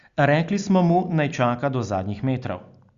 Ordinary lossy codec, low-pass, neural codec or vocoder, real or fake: Opus, 64 kbps; 7.2 kHz; none; real